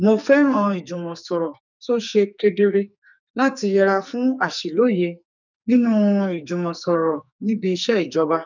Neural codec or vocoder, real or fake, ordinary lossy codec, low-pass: codec, 44.1 kHz, 2.6 kbps, SNAC; fake; none; 7.2 kHz